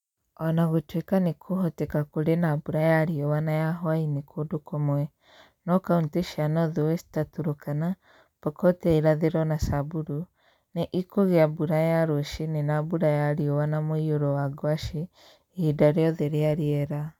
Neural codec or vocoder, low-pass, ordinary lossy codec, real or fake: none; 19.8 kHz; none; real